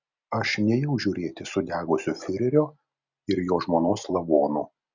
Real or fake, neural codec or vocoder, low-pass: real; none; 7.2 kHz